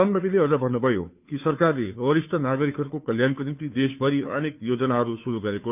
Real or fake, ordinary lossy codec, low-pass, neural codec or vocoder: fake; none; 3.6 kHz; codec, 16 kHz, 2 kbps, FunCodec, trained on Chinese and English, 25 frames a second